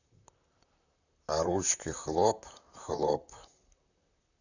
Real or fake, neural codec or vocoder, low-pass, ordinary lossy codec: fake; vocoder, 44.1 kHz, 128 mel bands, Pupu-Vocoder; 7.2 kHz; none